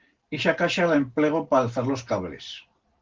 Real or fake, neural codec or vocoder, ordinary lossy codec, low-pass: real; none; Opus, 16 kbps; 7.2 kHz